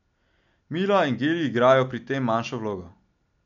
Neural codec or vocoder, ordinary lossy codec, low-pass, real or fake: none; MP3, 64 kbps; 7.2 kHz; real